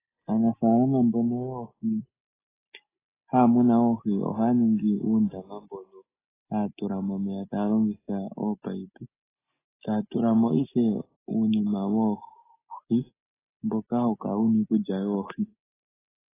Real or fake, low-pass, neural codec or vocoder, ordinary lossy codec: real; 3.6 kHz; none; AAC, 16 kbps